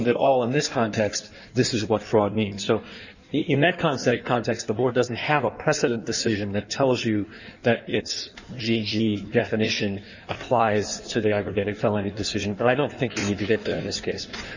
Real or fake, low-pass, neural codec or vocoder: fake; 7.2 kHz; codec, 16 kHz in and 24 kHz out, 1.1 kbps, FireRedTTS-2 codec